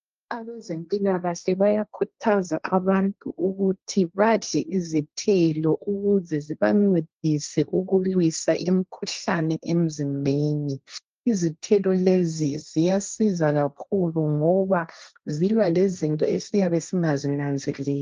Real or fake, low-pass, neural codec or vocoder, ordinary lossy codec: fake; 7.2 kHz; codec, 16 kHz, 1.1 kbps, Voila-Tokenizer; Opus, 24 kbps